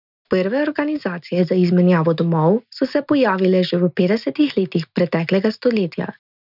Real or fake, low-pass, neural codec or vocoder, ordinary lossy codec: real; 5.4 kHz; none; none